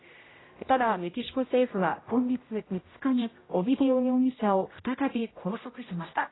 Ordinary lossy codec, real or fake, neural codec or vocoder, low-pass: AAC, 16 kbps; fake; codec, 16 kHz, 0.5 kbps, X-Codec, HuBERT features, trained on general audio; 7.2 kHz